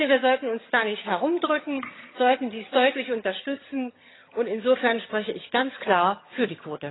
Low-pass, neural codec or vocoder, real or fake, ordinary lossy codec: 7.2 kHz; vocoder, 22.05 kHz, 80 mel bands, HiFi-GAN; fake; AAC, 16 kbps